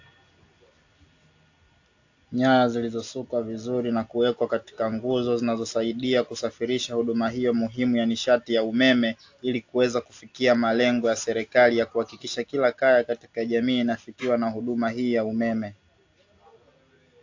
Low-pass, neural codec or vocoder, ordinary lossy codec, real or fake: 7.2 kHz; none; AAC, 48 kbps; real